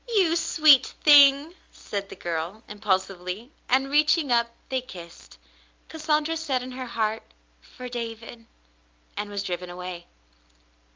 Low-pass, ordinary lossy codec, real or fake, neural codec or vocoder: 7.2 kHz; Opus, 32 kbps; real; none